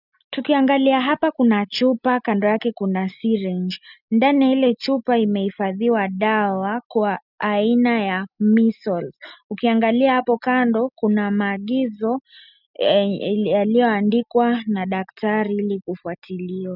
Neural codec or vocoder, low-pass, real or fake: none; 5.4 kHz; real